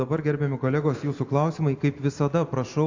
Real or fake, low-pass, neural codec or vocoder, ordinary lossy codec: real; 7.2 kHz; none; AAC, 48 kbps